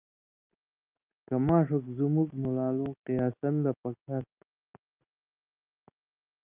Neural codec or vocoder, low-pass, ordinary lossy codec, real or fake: none; 3.6 kHz; Opus, 24 kbps; real